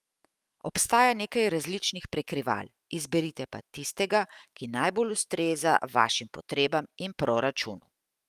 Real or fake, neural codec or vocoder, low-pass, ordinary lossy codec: fake; autoencoder, 48 kHz, 128 numbers a frame, DAC-VAE, trained on Japanese speech; 14.4 kHz; Opus, 32 kbps